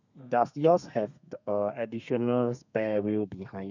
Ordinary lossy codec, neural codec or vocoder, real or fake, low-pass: none; codec, 32 kHz, 1.9 kbps, SNAC; fake; 7.2 kHz